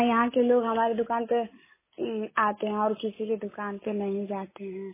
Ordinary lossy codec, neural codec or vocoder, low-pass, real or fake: MP3, 16 kbps; none; 3.6 kHz; real